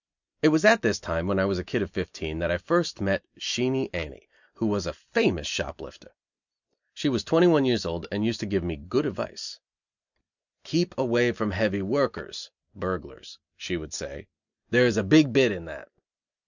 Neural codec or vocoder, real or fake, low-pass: none; real; 7.2 kHz